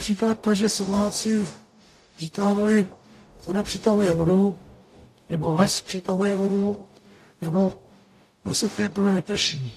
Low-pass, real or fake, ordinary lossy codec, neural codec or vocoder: 14.4 kHz; fake; AAC, 64 kbps; codec, 44.1 kHz, 0.9 kbps, DAC